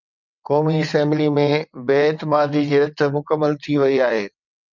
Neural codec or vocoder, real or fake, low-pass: vocoder, 22.05 kHz, 80 mel bands, WaveNeXt; fake; 7.2 kHz